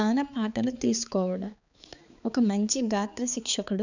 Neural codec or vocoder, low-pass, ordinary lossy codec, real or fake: codec, 16 kHz, 4 kbps, X-Codec, HuBERT features, trained on balanced general audio; 7.2 kHz; none; fake